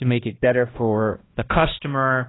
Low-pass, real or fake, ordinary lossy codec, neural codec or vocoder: 7.2 kHz; fake; AAC, 16 kbps; codec, 16 kHz, 1 kbps, X-Codec, HuBERT features, trained on balanced general audio